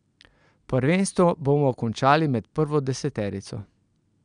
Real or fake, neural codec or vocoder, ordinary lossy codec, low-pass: real; none; none; 9.9 kHz